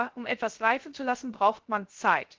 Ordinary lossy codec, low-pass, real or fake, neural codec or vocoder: Opus, 16 kbps; 7.2 kHz; fake; codec, 16 kHz, 0.3 kbps, FocalCodec